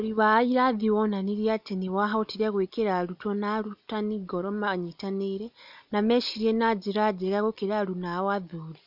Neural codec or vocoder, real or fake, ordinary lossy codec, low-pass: none; real; none; 5.4 kHz